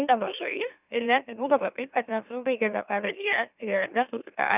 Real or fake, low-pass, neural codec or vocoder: fake; 3.6 kHz; autoencoder, 44.1 kHz, a latent of 192 numbers a frame, MeloTTS